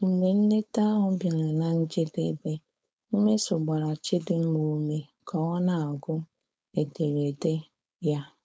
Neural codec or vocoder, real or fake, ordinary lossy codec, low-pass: codec, 16 kHz, 4.8 kbps, FACodec; fake; none; none